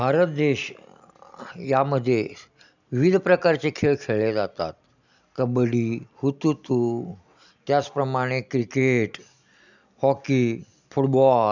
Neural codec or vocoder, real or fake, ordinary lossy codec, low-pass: none; real; none; 7.2 kHz